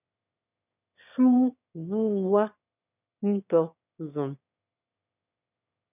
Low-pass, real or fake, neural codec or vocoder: 3.6 kHz; fake; autoencoder, 22.05 kHz, a latent of 192 numbers a frame, VITS, trained on one speaker